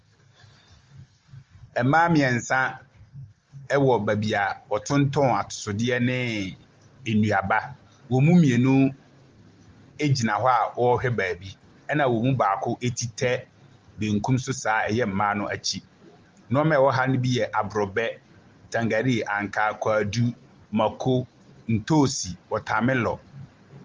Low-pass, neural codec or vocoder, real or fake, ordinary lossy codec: 7.2 kHz; none; real; Opus, 32 kbps